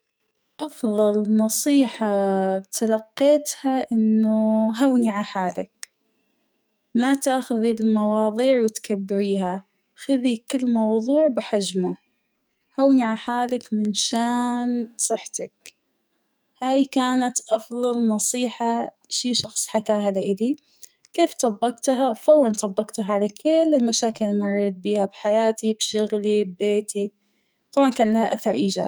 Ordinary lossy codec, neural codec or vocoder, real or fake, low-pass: none; codec, 44.1 kHz, 2.6 kbps, SNAC; fake; none